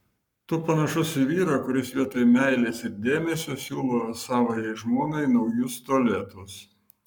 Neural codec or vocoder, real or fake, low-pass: codec, 44.1 kHz, 7.8 kbps, Pupu-Codec; fake; 19.8 kHz